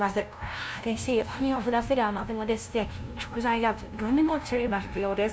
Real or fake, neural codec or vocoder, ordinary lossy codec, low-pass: fake; codec, 16 kHz, 0.5 kbps, FunCodec, trained on LibriTTS, 25 frames a second; none; none